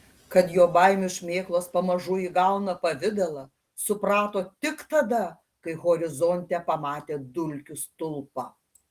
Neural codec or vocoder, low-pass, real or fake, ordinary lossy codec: none; 14.4 kHz; real; Opus, 24 kbps